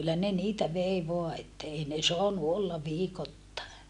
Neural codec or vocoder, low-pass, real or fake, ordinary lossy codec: none; 10.8 kHz; real; none